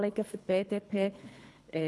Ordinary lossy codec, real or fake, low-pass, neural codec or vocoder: none; fake; none; codec, 24 kHz, 3 kbps, HILCodec